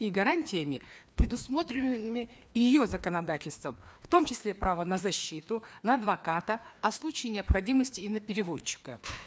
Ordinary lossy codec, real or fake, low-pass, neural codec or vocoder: none; fake; none; codec, 16 kHz, 2 kbps, FreqCodec, larger model